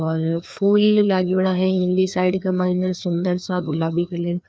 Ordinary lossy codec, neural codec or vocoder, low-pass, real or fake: none; codec, 16 kHz, 2 kbps, FreqCodec, larger model; none; fake